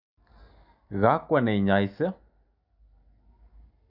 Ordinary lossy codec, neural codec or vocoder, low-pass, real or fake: none; none; 5.4 kHz; real